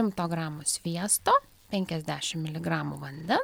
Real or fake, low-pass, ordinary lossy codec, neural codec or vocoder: real; 19.8 kHz; MP3, 96 kbps; none